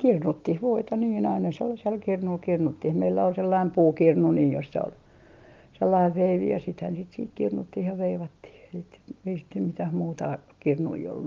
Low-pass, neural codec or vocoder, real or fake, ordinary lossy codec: 7.2 kHz; none; real; Opus, 24 kbps